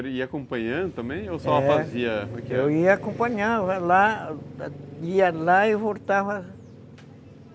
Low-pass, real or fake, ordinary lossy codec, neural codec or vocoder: none; real; none; none